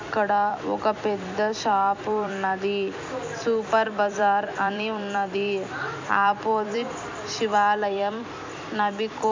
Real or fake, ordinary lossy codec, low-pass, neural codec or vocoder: fake; MP3, 64 kbps; 7.2 kHz; vocoder, 44.1 kHz, 128 mel bands every 256 samples, BigVGAN v2